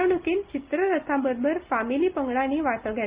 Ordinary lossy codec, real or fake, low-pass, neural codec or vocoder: Opus, 24 kbps; real; 3.6 kHz; none